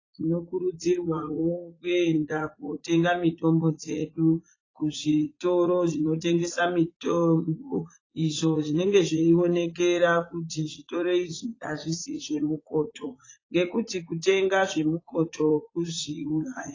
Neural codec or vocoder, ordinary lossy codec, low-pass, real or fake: vocoder, 44.1 kHz, 80 mel bands, Vocos; AAC, 32 kbps; 7.2 kHz; fake